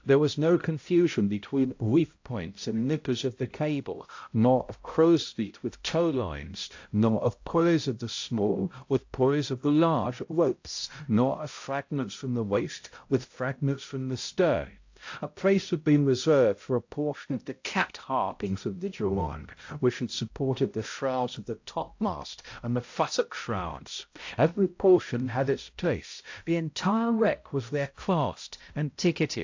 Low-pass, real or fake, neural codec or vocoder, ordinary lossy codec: 7.2 kHz; fake; codec, 16 kHz, 0.5 kbps, X-Codec, HuBERT features, trained on balanced general audio; AAC, 48 kbps